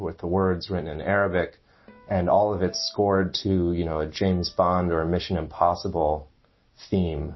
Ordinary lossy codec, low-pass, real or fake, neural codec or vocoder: MP3, 24 kbps; 7.2 kHz; real; none